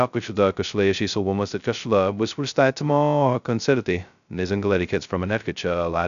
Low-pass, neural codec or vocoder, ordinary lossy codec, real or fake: 7.2 kHz; codec, 16 kHz, 0.2 kbps, FocalCodec; AAC, 96 kbps; fake